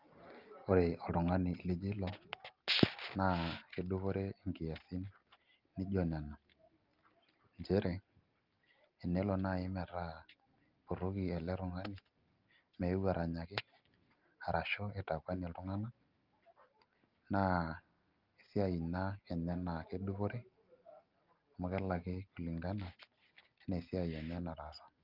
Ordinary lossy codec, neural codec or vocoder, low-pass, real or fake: Opus, 24 kbps; none; 5.4 kHz; real